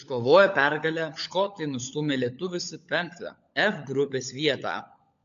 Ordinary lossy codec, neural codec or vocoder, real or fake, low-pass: AAC, 64 kbps; codec, 16 kHz, 16 kbps, FunCodec, trained on LibriTTS, 50 frames a second; fake; 7.2 kHz